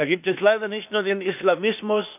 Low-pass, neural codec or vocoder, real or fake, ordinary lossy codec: 3.6 kHz; codec, 16 kHz, 0.8 kbps, ZipCodec; fake; none